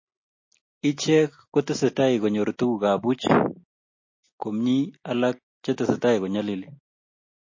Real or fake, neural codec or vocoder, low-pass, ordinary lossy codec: real; none; 7.2 kHz; MP3, 32 kbps